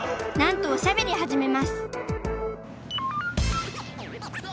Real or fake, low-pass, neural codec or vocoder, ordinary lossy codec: real; none; none; none